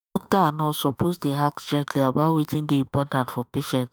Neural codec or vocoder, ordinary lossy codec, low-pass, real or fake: autoencoder, 48 kHz, 32 numbers a frame, DAC-VAE, trained on Japanese speech; none; none; fake